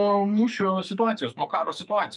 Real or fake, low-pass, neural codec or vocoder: fake; 10.8 kHz; codec, 44.1 kHz, 2.6 kbps, SNAC